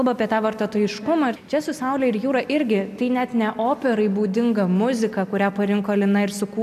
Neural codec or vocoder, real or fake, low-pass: none; real; 14.4 kHz